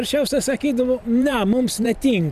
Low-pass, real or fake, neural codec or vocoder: 14.4 kHz; fake; vocoder, 44.1 kHz, 128 mel bands every 512 samples, BigVGAN v2